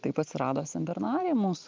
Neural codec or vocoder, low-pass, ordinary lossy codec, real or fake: none; 7.2 kHz; Opus, 32 kbps; real